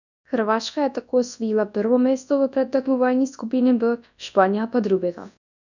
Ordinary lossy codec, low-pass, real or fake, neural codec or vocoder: none; 7.2 kHz; fake; codec, 24 kHz, 0.9 kbps, WavTokenizer, large speech release